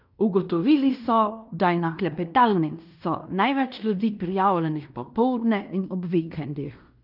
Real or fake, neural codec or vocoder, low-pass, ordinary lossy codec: fake; codec, 16 kHz in and 24 kHz out, 0.9 kbps, LongCat-Audio-Codec, fine tuned four codebook decoder; 5.4 kHz; none